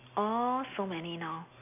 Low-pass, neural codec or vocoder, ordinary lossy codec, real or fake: 3.6 kHz; none; none; real